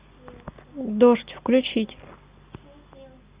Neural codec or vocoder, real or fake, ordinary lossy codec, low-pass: none; real; Opus, 64 kbps; 3.6 kHz